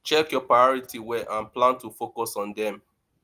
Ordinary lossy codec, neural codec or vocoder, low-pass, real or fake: Opus, 32 kbps; none; 14.4 kHz; real